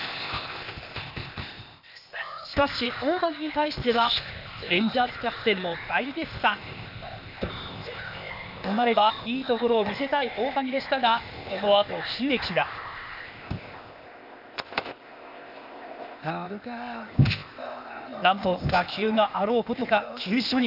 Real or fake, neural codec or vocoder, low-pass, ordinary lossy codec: fake; codec, 16 kHz, 0.8 kbps, ZipCodec; 5.4 kHz; none